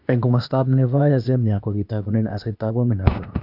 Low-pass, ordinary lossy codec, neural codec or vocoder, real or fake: 5.4 kHz; none; codec, 16 kHz, 0.8 kbps, ZipCodec; fake